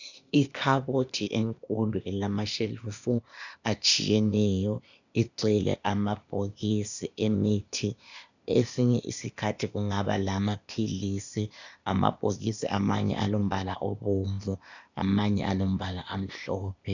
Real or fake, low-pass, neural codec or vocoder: fake; 7.2 kHz; codec, 16 kHz, 0.8 kbps, ZipCodec